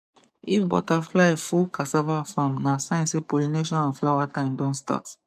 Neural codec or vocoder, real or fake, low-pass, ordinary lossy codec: codec, 44.1 kHz, 7.8 kbps, DAC; fake; 14.4 kHz; MP3, 96 kbps